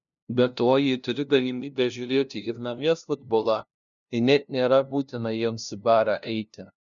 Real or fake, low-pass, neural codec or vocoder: fake; 7.2 kHz; codec, 16 kHz, 0.5 kbps, FunCodec, trained on LibriTTS, 25 frames a second